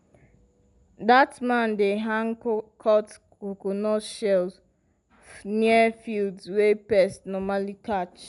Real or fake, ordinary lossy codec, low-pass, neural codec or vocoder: real; none; 10.8 kHz; none